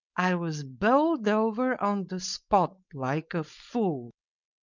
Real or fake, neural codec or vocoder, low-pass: fake; codec, 16 kHz, 4.8 kbps, FACodec; 7.2 kHz